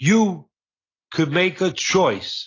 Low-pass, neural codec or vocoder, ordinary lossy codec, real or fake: 7.2 kHz; none; AAC, 32 kbps; real